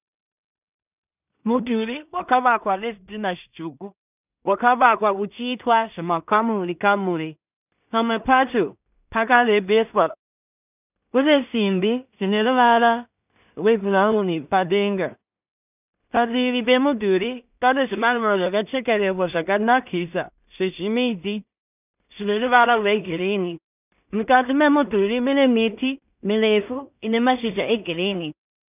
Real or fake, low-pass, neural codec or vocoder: fake; 3.6 kHz; codec, 16 kHz in and 24 kHz out, 0.4 kbps, LongCat-Audio-Codec, two codebook decoder